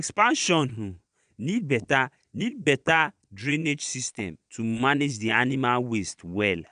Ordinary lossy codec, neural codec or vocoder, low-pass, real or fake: none; vocoder, 22.05 kHz, 80 mel bands, Vocos; 9.9 kHz; fake